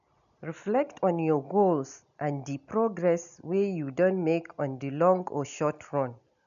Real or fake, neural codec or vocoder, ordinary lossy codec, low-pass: real; none; none; 7.2 kHz